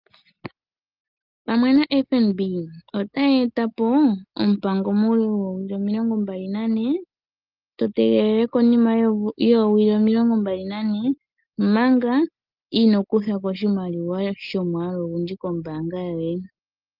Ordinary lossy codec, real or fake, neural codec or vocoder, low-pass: Opus, 32 kbps; real; none; 5.4 kHz